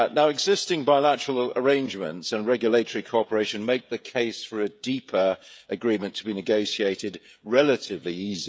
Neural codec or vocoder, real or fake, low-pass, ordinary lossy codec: codec, 16 kHz, 16 kbps, FreqCodec, smaller model; fake; none; none